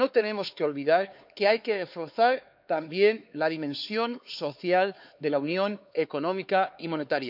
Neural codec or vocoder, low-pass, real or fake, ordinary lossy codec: codec, 16 kHz, 4 kbps, X-Codec, HuBERT features, trained on LibriSpeech; 5.4 kHz; fake; none